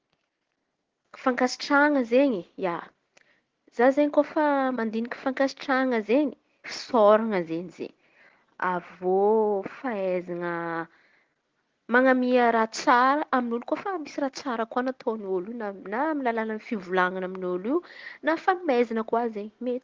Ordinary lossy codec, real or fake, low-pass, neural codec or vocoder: Opus, 16 kbps; real; 7.2 kHz; none